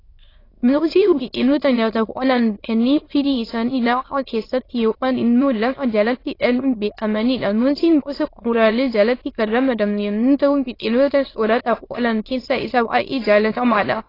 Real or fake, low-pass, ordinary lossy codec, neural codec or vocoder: fake; 5.4 kHz; AAC, 24 kbps; autoencoder, 22.05 kHz, a latent of 192 numbers a frame, VITS, trained on many speakers